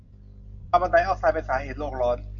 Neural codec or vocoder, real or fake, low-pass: none; real; 7.2 kHz